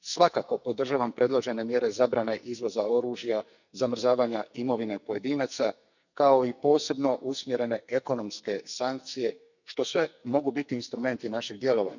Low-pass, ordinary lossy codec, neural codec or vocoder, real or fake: 7.2 kHz; none; codec, 44.1 kHz, 2.6 kbps, SNAC; fake